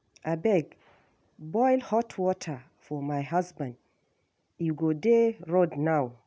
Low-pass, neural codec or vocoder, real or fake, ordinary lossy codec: none; none; real; none